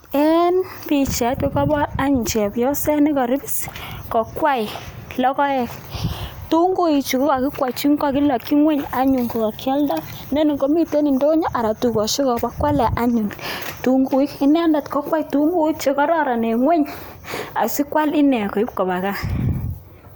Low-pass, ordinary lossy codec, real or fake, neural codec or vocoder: none; none; real; none